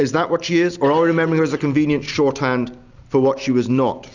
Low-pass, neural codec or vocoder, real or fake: 7.2 kHz; none; real